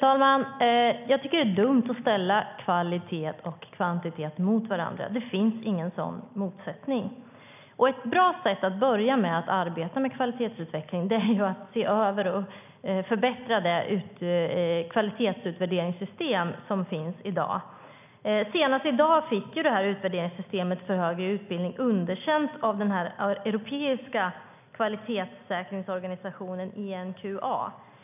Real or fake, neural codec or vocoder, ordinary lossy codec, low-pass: real; none; none; 3.6 kHz